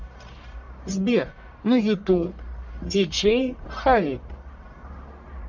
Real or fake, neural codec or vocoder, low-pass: fake; codec, 44.1 kHz, 1.7 kbps, Pupu-Codec; 7.2 kHz